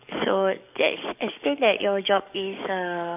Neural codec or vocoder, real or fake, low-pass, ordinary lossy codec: codec, 44.1 kHz, 7.8 kbps, DAC; fake; 3.6 kHz; AAC, 32 kbps